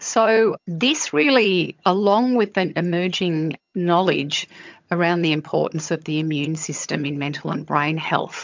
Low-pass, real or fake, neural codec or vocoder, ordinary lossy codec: 7.2 kHz; fake; vocoder, 22.05 kHz, 80 mel bands, HiFi-GAN; MP3, 64 kbps